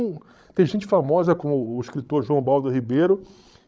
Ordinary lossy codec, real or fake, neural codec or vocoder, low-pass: none; fake; codec, 16 kHz, 16 kbps, FreqCodec, larger model; none